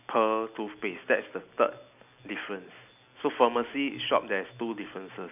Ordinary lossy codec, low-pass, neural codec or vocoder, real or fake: none; 3.6 kHz; none; real